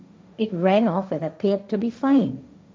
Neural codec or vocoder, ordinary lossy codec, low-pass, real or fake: codec, 16 kHz, 1.1 kbps, Voila-Tokenizer; none; none; fake